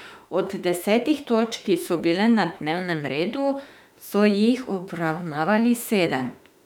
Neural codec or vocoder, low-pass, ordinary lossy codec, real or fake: autoencoder, 48 kHz, 32 numbers a frame, DAC-VAE, trained on Japanese speech; 19.8 kHz; none; fake